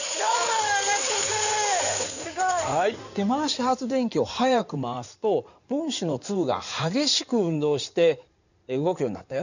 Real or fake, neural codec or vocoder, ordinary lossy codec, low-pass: fake; codec, 16 kHz in and 24 kHz out, 2.2 kbps, FireRedTTS-2 codec; none; 7.2 kHz